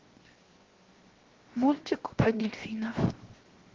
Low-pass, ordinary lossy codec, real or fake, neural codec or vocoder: 7.2 kHz; Opus, 16 kbps; fake; codec, 16 kHz, 0.7 kbps, FocalCodec